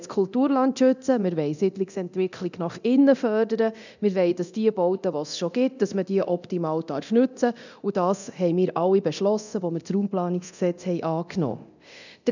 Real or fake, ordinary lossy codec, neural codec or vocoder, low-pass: fake; none; codec, 24 kHz, 0.9 kbps, DualCodec; 7.2 kHz